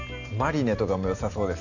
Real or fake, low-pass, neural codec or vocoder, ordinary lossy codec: real; 7.2 kHz; none; none